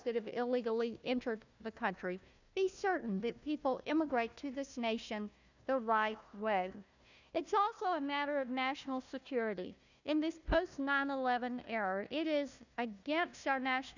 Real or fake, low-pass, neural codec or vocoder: fake; 7.2 kHz; codec, 16 kHz, 1 kbps, FunCodec, trained on Chinese and English, 50 frames a second